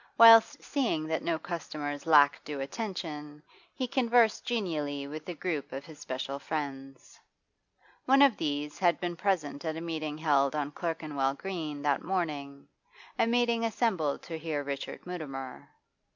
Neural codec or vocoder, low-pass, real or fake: none; 7.2 kHz; real